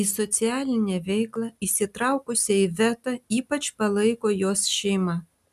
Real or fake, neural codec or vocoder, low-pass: real; none; 14.4 kHz